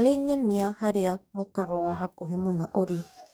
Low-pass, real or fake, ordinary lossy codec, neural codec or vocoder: none; fake; none; codec, 44.1 kHz, 2.6 kbps, DAC